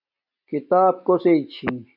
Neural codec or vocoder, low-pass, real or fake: none; 5.4 kHz; real